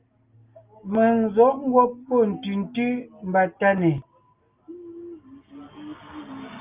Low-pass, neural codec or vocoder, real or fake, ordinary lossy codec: 3.6 kHz; none; real; Opus, 24 kbps